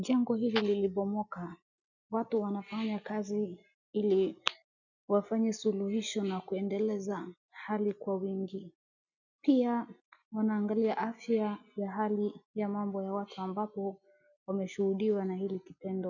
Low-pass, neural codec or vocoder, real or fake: 7.2 kHz; none; real